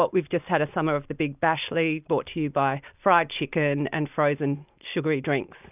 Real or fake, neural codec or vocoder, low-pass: real; none; 3.6 kHz